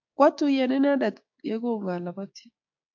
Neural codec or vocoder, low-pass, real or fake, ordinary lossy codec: codec, 16 kHz, 6 kbps, DAC; 7.2 kHz; fake; AAC, 48 kbps